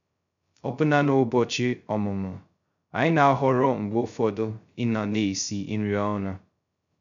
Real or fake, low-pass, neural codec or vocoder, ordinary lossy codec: fake; 7.2 kHz; codec, 16 kHz, 0.2 kbps, FocalCodec; none